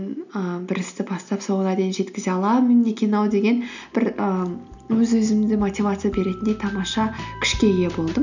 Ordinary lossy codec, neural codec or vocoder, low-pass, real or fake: none; none; 7.2 kHz; real